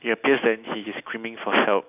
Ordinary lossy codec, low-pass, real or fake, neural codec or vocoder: none; 3.6 kHz; real; none